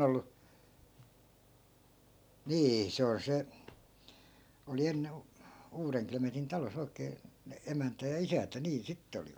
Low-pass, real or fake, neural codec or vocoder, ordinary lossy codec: none; real; none; none